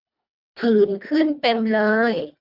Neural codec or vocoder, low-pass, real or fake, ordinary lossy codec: codec, 24 kHz, 1.5 kbps, HILCodec; 5.4 kHz; fake; none